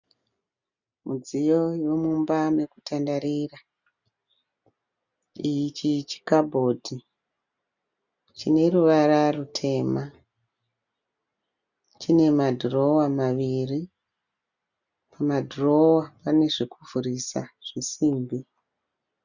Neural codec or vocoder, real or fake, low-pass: none; real; 7.2 kHz